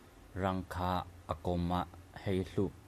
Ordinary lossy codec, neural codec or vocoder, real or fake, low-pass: AAC, 96 kbps; none; real; 14.4 kHz